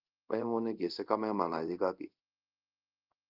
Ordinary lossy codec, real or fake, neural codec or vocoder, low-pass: Opus, 32 kbps; fake; codec, 24 kHz, 0.5 kbps, DualCodec; 5.4 kHz